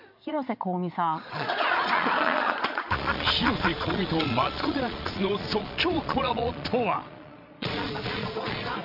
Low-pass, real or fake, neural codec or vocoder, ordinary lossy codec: 5.4 kHz; fake; vocoder, 22.05 kHz, 80 mel bands, Vocos; none